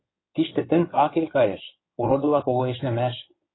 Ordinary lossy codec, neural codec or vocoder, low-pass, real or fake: AAC, 16 kbps; codec, 16 kHz in and 24 kHz out, 2.2 kbps, FireRedTTS-2 codec; 7.2 kHz; fake